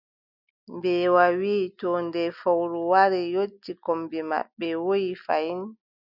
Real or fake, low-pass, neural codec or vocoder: real; 5.4 kHz; none